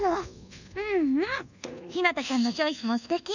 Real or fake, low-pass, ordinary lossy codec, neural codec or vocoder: fake; 7.2 kHz; none; codec, 24 kHz, 1.2 kbps, DualCodec